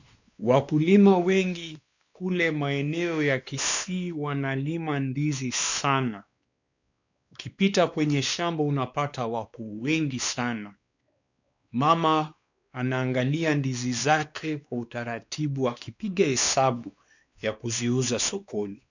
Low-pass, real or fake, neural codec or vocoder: 7.2 kHz; fake; codec, 16 kHz, 2 kbps, X-Codec, WavLM features, trained on Multilingual LibriSpeech